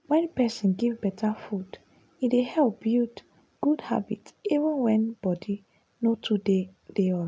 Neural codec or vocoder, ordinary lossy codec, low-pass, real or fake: none; none; none; real